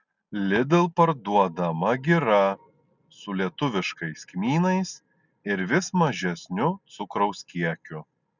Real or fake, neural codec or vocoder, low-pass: real; none; 7.2 kHz